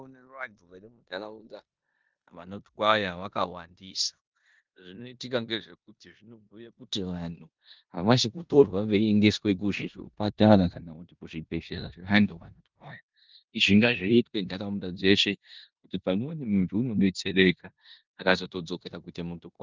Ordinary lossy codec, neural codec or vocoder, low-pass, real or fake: Opus, 24 kbps; codec, 16 kHz in and 24 kHz out, 0.9 kbps, LongCat-Audio-Codec, four codebook decoder; 7.2 kHz; fake